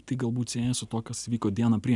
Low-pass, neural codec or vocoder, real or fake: 10.8 kHz; none; real